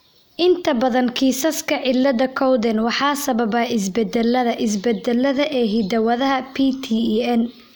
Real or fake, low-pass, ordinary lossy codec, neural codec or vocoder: real; none; none; none